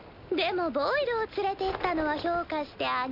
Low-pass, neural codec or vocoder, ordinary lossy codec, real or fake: 5.4 kHz; none; none; real